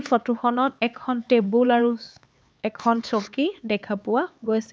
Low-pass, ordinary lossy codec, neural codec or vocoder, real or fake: none; none; codec, 16 kHz, 4 kbps, X-Codec, HuBERT features, trained on LibriSpeech; fake